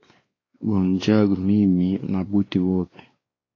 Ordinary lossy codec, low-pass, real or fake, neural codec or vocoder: AAC, 32 kbps; 7.2 kHz; fake; codec, 16 kHz, 2 kbps, X-Codec, WavLM features, trained on Multilingual LibriSpeech